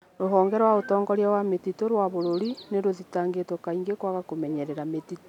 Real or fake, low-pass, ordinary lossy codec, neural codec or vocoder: real; 19.8 kHz; none; none